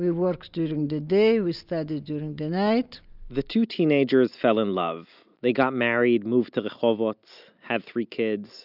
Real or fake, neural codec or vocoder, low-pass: real; none; 5.4 kHz